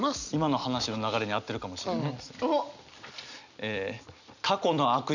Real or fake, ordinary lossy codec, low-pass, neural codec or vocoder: real; none; 7.2 kHz; none